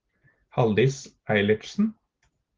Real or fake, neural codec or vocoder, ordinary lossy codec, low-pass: real; none; Opus, 16 kbps; 7.2 kHz